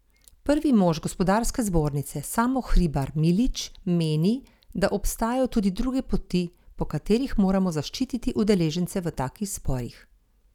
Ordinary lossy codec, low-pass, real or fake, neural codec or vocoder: none; 19.8 kHz; real; none